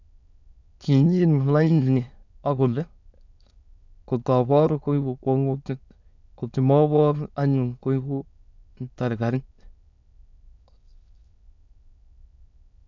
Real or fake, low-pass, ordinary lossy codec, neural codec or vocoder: fake; 7.2 kHz; none; autoencoder, 22.05 kHz, a latent of 192 numbers a frame, VITS, trained on many speakers